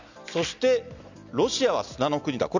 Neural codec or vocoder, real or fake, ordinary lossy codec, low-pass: none; real; none; 7.2 kHz